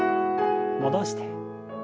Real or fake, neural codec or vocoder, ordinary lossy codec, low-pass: real; none; none; none